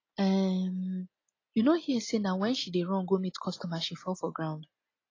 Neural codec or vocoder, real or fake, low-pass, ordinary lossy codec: none; real; 7.2 kHz; AAC, 32 kbps